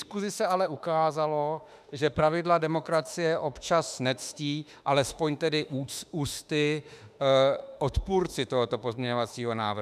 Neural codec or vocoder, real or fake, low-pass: autoencoder, 48 kHz, 32 numbers a frame, DAC-VAE, trained on Japanese speech; fake; 14.4 kHz